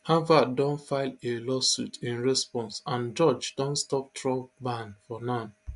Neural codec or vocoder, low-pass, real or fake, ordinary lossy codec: none; 10.8 kHz; real; MP3, 64 kbps